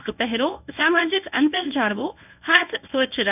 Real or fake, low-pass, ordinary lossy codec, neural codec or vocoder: fake; 3.6 kHz; none; codec, 24 kHz, 0.9 kbps, WavTokenizer, medium speech release version 1